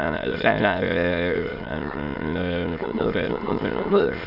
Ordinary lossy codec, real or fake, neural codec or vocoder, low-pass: none; fake; autoencoder, 22.05 kHz, a latent of 192 numbers a frame, VITS, trained on many speakers; 5.4 kHz